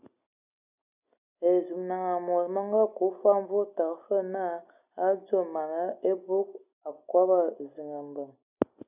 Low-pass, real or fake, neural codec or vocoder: 3.6 kHz; real; none